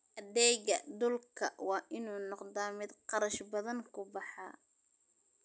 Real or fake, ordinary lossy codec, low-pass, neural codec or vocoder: real; none; none; none